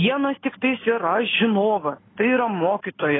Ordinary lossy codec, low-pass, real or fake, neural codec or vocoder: AAC, 16 kbps; 7.2 kHz; real; none